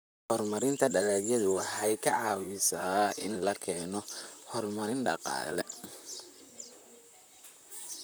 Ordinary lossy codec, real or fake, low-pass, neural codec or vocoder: none; fake; none; vocoder, 44.1 kHz, 128 mel bands, Pupu-Vocoder